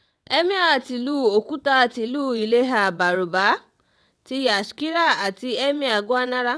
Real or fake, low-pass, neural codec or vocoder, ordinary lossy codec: fake; none; vocoder, 22.05 kHz, 80 mel bands, WaveNeXt; none